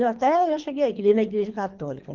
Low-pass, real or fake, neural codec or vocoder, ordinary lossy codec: 7.2 kHz; fake; codec, 24 kHz, 3 kbps, HILCodec; Opus, 32 kbps